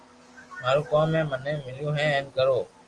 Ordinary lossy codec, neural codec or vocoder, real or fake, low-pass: Opus, 24 kbps; none; real; 10.8 kHz